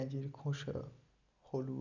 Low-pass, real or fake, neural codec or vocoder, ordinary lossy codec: 7.2 kHz; real; none; none